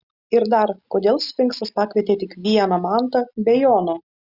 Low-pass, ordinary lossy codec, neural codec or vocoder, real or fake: 5.4 kHz; Opus, 64 kbps; none; real